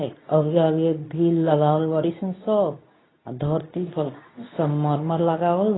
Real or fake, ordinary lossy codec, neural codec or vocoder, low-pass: fake; AAC, 16 kbps; codec, 24 kHz, 0.9 kbps, WavTokenizer, medium speech release version 2; 7.2 kHz